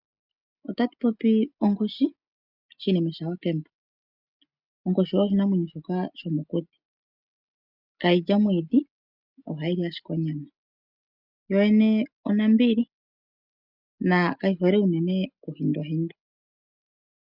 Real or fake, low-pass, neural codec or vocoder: real; 5.4 kHz; none